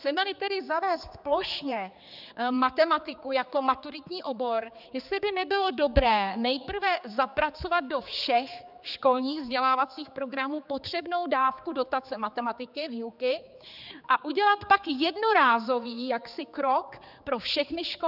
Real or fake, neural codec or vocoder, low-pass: fake; codec, 16 kHz, 4 kbps, X-Codec, HuBERT features, trained on general audio; 5.4 kHz